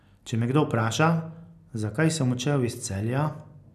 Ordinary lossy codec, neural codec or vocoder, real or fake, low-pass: none; none; real; 14.4 kHz